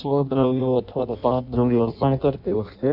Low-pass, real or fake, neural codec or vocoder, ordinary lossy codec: 5.4 kHz; fake; codec, 16 kHz in and 24 kHz out, 0.6 kbps, FireRedTTS-2 codec; none